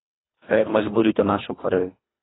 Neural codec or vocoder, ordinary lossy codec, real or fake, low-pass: codec, 24 kHz, 1.5 kbps, HILCodec; AAC, 16 kbps; fake; 7.2 kHz